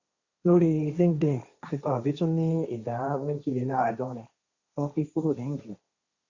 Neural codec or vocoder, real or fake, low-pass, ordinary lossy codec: codec, 16 kHz, 1.1 kbps, Voila-Tokenizer; fake; 7.2 kHz; Opus, 64 kbps